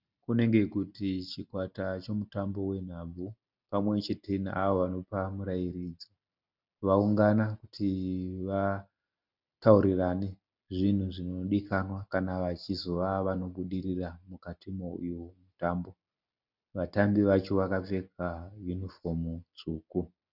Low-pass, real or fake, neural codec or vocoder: 5.4 kHz; real; none